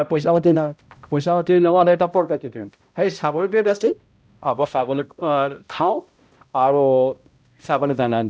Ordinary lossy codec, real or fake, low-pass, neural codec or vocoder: none; fake; none; codec, 16 kHz, 0.5 kbps, X-Codec, HuBERT features, trained on balanced general audio